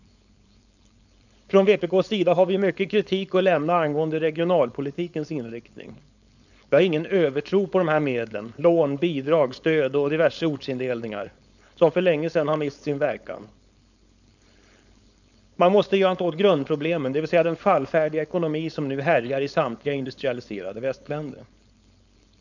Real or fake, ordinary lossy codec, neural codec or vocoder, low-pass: fake; none; codec, 16 kHz, 4.8 kbps, FACodec; 7.2 kHz